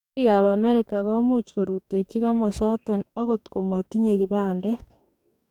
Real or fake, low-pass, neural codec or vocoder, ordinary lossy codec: fake; 19.8 kHz; codec, 44.1 kHz, 2.6 kbps, DAC; none